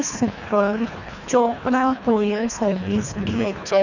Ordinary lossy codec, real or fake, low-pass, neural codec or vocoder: none; fake; 7.2 kHz; codec, 24 kHz, 1.5 kbps, HILCodec